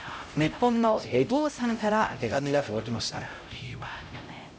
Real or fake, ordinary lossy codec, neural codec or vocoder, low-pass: fake; none; codec, 16 kHz, 0.5 kbps, X-Codec, HuBERT features, trained on LibriSpeech; none